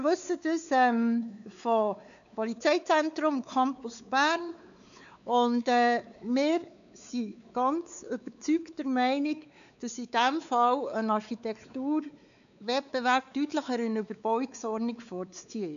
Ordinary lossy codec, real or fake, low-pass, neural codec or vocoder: none; fake; 7.2 kHz; codec, 16 kHz, 4 kbps, X-Codec, WavLM features, trained on Multilingual LibriSpeech